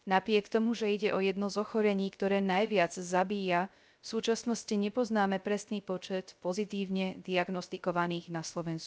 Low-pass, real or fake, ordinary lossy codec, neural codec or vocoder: none; fake; none; codec, 16 kHz, 0.3 kbps, FocalCodec